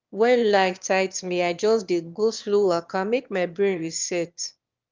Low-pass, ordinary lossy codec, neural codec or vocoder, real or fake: 7.2 kHz; Opus, 32 kbps; autoencoder, 22.05 kHz, a latent of 192 numbers a frame, VITS, trained on one speaker; fake